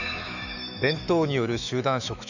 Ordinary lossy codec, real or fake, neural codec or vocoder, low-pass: none; fake; autoencoder, 48 kHz, 128 numbers a frame, DAC-VAE, trained on Japanese speech; 7.2 kHz